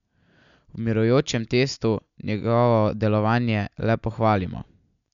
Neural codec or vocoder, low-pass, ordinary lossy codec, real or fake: none; 7.2 kHz; none; real